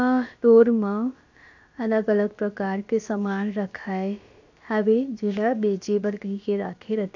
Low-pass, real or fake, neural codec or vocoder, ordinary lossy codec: 7.2 kHz; fake; codec, 16 kHz, about 1 kbps, DyCAST, with the encoder's durations; AAC, 48 kbps